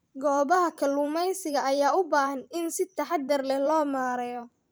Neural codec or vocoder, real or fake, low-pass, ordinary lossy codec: vocoder, 44.1 kHz, 128 mel bands every 512 samples, BigVGAN v2; fake; none; none